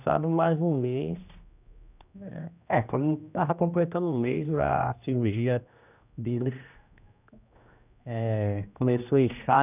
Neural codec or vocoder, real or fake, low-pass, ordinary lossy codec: codec, 16 kHz, 1 kbps, X-Codec, HuBERT features, trained on general audio; fake; 3.6 kHz; none